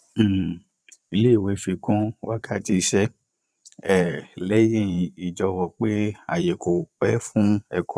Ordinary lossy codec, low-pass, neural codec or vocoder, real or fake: none; none; vocoder, 22.05 kHz, 80 mel bands, Vocos; fake